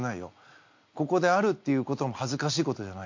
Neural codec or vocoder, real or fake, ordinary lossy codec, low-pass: none; real; MP3, 48 kbps; 7.2 kHz